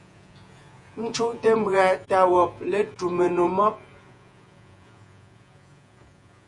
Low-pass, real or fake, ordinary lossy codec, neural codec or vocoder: 10.8 kHz; fake; Opus, 64 kbps; vocoder, 48 kHz, 128 mel bands, Vocos